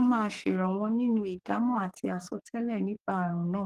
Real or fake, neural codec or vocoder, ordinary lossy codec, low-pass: fake; codec, 44.1 kHz, 2.6 kbps, SNAC; Opus, 24 kbps; 14.4 kHz